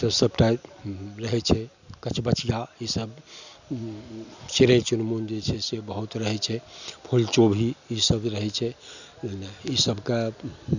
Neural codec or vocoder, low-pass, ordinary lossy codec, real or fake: none; 7.2 kHz; none; real